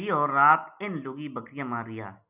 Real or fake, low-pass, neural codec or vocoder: real; 3.6 kHz; none